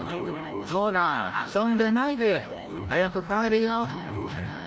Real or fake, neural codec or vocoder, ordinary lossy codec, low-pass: fake; codec, 16 kHz, 0.5 kbps, FreqCodec, larger model; none; none